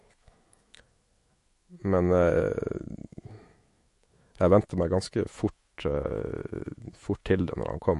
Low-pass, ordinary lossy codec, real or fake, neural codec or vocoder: 14.4 kHz; MP3, 48 kbps; fake; autoencoder, 48 kHz, 128 numbers a frame, DAC-VAE, trained on Japanese speech